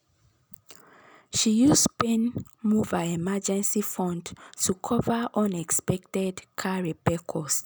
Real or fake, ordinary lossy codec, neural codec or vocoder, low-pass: real; none; none; none